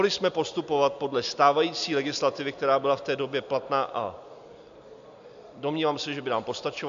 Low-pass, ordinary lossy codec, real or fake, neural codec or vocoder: 7.2 kHz; MP3, 64 kbps; real; none